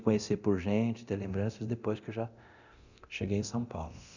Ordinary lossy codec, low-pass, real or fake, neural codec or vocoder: none; 7.2 kHz; fake; codec, 24 kHz, 0.9 kbps, DualCodec